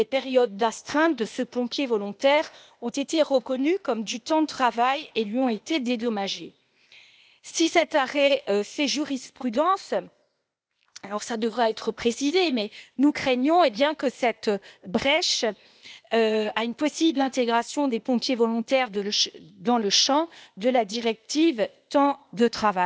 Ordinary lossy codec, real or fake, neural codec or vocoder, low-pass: none; fake; codec, 16 kHz, 0.8 kbps, ZipCodec; none